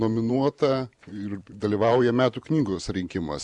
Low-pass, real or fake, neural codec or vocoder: 10.8 kHz; fake; vocoder, 44.1 kHz, 128 mel bands every 512 samples, BigVGAN v2